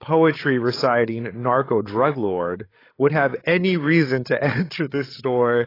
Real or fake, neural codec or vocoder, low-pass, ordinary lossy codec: fake; codec, 16 kHz, 16 kbps, FreqCodec, larger model; 5.4 kHz; AAC, 24 kbps